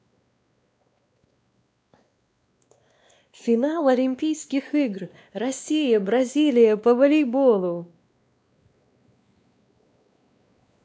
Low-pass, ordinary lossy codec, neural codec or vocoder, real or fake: none; none; codec, 16 kHz, 2 kbps, X-Codec, WavLM features, trained on Multilingual LibriSpeech; fake